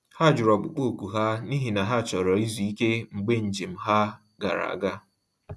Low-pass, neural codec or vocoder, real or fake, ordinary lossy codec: none; none; real; none